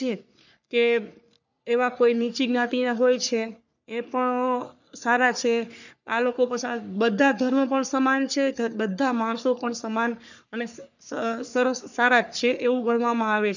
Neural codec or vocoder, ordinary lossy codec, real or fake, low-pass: codec, 44.1 kHz, 3.4 kbps, Pupu-Codec; none; fake; 7.2 kHz